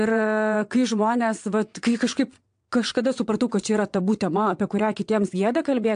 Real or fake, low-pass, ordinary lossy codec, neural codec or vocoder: fake; 9.9 kHz; AAC, 64 kbps; vocoder, 22.05 kHz, 80 mel bands, WaveNeXt